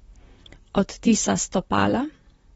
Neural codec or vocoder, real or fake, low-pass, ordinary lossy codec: vocoder, 44.1 kHz, 128 mel bands, Pupu-Vocoder; fake; 19.8 kHz; AAC, 24 kbps